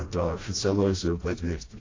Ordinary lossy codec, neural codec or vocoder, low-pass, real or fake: AAC, 32 kbps; codec, 16 kHz, 1 kbps, FreqCodec, smaller model; 7.2 kHz; fake